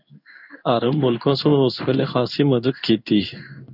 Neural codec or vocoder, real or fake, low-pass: codec, 16 kHz in and 24 kHz out, 1 kbps, XY-Tokenizer; fake; 5.4 kHz